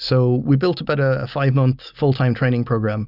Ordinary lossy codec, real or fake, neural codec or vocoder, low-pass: Opus, 64 kbps; real; none; 5.4 kHz